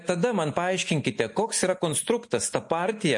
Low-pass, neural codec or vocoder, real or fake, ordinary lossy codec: 10.8 kHz; none; real; MP3, 48 kbps